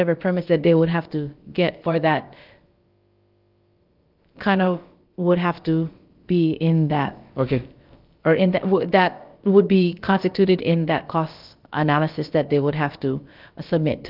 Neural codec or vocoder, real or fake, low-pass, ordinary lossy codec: codec, 16 kHz, about 1 kbps, DyCAST, with the encoder's durations; fake; 5.4 kHz; Opus, 32 kbps